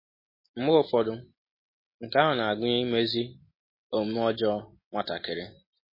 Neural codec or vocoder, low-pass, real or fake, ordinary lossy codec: none; 5.4 kHz; real; MP3, 24 kbps